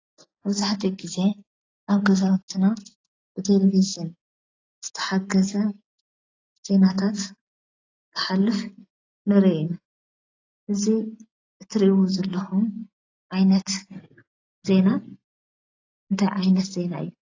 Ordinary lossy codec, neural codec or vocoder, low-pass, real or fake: AAC, 32 kbps; none; 7.2 kHz; real